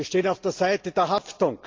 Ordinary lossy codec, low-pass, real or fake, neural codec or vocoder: Opus, 24 kbps; 7.2 kHz; real; none